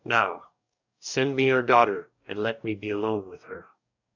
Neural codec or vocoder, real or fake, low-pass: codec, 44.1 kHz, 2.6 kbps, DAC; fake; 7.2 kHz